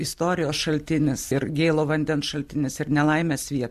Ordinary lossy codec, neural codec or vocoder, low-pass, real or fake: MP3, 96 kbps; none; 14.4 kHz; real